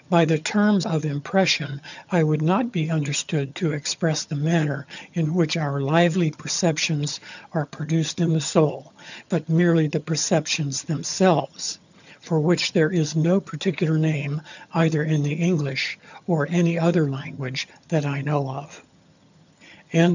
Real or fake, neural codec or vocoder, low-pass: fake; vocoder, 22.05 kHz, 80 mel bands, HiFi-GAN; 7.2 kHz